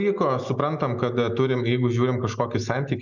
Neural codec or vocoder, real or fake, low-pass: none; real; 7.2 kHz